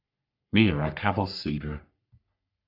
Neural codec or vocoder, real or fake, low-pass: codec, 44.1 kHz, 3.4 kbps, Pupu-Codec; fake; 5.4 kHz